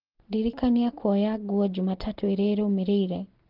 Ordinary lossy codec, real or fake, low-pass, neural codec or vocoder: Opus, 16 kbps; real; 5.4 kHz; none